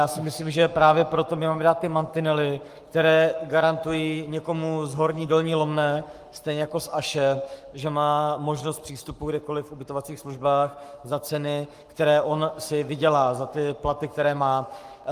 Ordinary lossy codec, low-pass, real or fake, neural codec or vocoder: Opus, 32 kbps; 14.4 kHz; fake; codec, 44.1 kHz, 7.8 kbps, Pupu-Codec